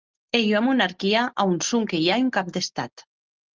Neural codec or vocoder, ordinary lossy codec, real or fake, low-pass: none; Opus, 24 kbps; real; 7.2 kHz